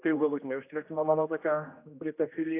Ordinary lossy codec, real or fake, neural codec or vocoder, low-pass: AAC, 24 kbps; fake; codec, 16 kHz, 1 kbps, X-Codec, HuBERT features, trained on general audio; 3.6 kHz